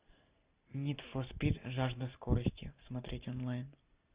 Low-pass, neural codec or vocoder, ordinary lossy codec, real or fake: 3.6 kHz; none; Opus, 64 kbps; real